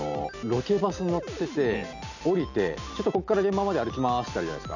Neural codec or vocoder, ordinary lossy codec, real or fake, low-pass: none; none; real; 7.2 kHz